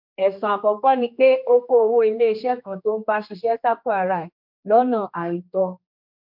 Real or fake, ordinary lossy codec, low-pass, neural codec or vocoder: fake; MP3, 48 kbps; 5.4 kHz; codec, 16 kHz, 2 kbps, X-Codec, HuBERT features, trained on general audio